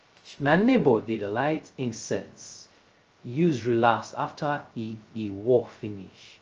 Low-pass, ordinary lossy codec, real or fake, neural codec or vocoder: 7.2 kHz; Opus, 24 kbps; fake; codec, 16 kHz, 0.2 kbps, FocalCodec